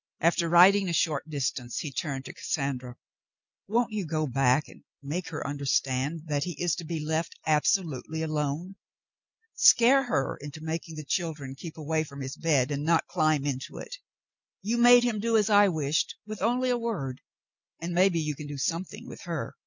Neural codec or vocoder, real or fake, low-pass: none; real; 7.2 kHz